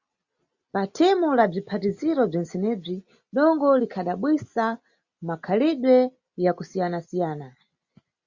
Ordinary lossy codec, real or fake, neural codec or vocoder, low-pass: Opus, 64 kbps; real; none; 7.2 kHz